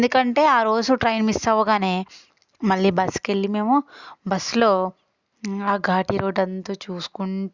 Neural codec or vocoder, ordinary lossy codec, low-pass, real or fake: none; Opus, 64 kbps; 7.2 kHz; real